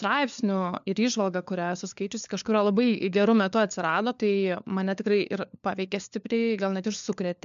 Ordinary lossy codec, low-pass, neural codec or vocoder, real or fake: MP3, 64 kbps; 7.2 kHz; codec, 16 kHz, 4 kbps, FunCodec, trained on LibriTTS, 50 frames a second; fake